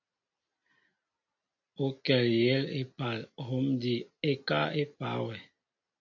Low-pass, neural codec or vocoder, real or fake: 7.2 kHz; none; real